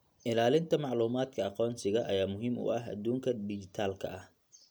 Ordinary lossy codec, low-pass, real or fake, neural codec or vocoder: none; none; real; none